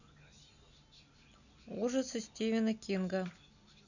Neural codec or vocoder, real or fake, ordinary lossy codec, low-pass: none; real; none; 7.2 kHz